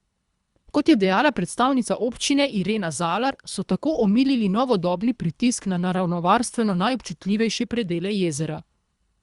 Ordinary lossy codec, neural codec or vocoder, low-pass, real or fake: Opus, 64 kbps; codec, 24 kHz, 3 kbps, HILCodec; 10.8 kHz; fake